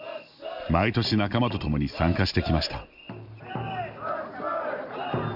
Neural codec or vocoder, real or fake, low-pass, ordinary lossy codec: none; real; 5.4 kHz; none